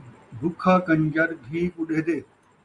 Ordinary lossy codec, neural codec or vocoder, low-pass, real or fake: Opus, 64 kbps; none; 10.8 kHz; real